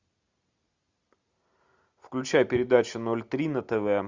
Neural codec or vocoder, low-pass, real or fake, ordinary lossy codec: none; 7.2 kHz; real; Opus, 64 kbps